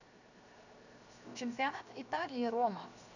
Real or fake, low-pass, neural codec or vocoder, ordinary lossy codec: fake; 7.2 kHz; codec, 16 kHz, 0.7 kbps, FocalCodec; none